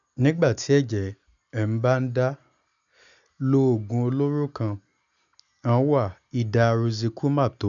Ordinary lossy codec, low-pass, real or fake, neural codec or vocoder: none; 7.2 kHz; real; none